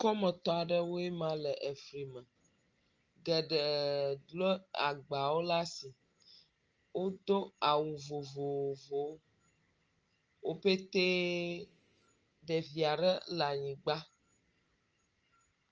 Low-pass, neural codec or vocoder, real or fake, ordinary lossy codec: 7.2 kHz; none; real; Opus, 24 kbps